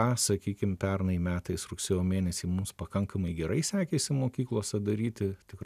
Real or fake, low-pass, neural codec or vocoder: real; 14.4 kHz; none